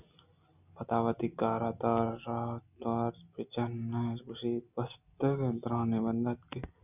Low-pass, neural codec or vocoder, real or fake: 3.6 kHz; none; real